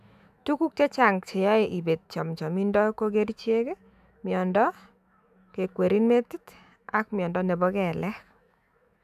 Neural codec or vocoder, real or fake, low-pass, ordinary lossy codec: autoencoder, 48 kHz, 128 numbers a frame, DAC-VAE, trained on Japanese speech; fake; 14.4 kHz; none